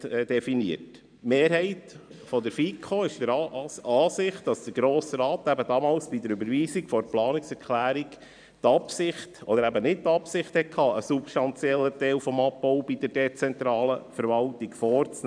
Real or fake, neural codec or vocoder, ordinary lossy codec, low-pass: fake; vocoder, 44.1 kHz, 128 mel bands every 512 samples, BigVGAN v2; none; 9.9 kHz